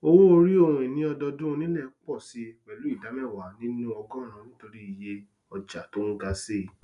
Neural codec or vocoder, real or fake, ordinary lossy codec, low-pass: none; real; none; 10.8 kHz